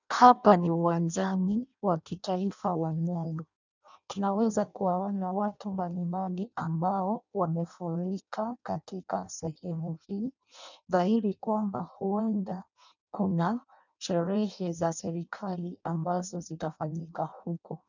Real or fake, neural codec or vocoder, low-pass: fake; codec, 16 kHz in and 24 kHz out, 0.6 kbps, FireRedTTS-2 codec; 7.2 kHz